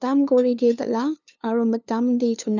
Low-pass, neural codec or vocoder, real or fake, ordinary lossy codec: 7.2 kHz; codec, 16 kHz, 2 kbps, FunCodec, trained on LibriTTS, 25 frames a second; fake; none